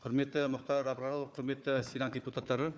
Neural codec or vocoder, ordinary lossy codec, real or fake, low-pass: codec, 16 kHz, 4 kbps, FunCodec, trained on Chinese and English, 50 frames a second; none; fake; none